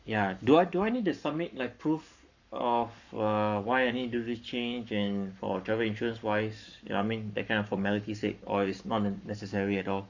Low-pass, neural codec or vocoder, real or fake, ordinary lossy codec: 7.2 kHz; codec, 44.1 kHz, 7.8 kbps, Pupu-Codec; fake; none